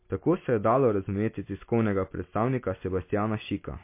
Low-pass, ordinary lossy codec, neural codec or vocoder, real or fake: 3.6 kHz; MP3, 24 kbps; none; real